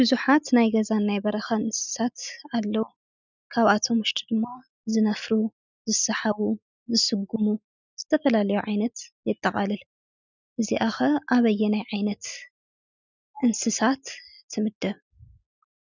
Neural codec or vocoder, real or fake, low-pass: none; real; 7.2 kHz